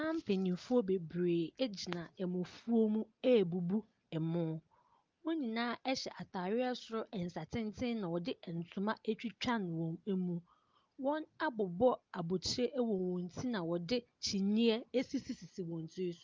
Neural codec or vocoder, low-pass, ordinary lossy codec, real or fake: none; 7.2 kHz; Opus, 32 kbps; real